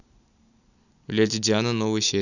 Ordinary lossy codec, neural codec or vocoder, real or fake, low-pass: none; none; real; 7.2 kHz